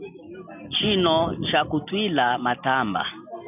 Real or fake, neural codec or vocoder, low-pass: real; none; 3.6 kHz